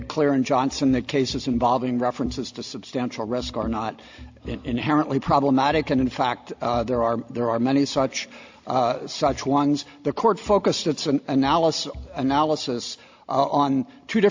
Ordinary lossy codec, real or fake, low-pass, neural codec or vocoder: AAC, 48 kbps; real; 7.2 kHz; none